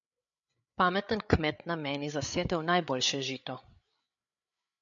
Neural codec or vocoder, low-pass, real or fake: codec, 16 kHz, 8 kbps, FreqCodec, larger model; 7.2 kHz; fake